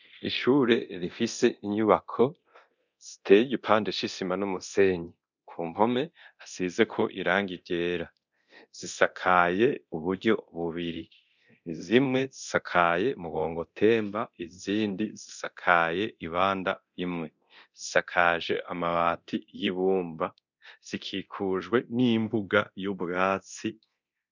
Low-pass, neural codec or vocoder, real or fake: 7.2 kHz; codec, 24 kHz, 0.9 kbps, DualCodec; fake